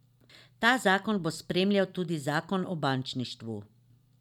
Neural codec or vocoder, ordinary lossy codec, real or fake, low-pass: none; none; real; 19.8 kHz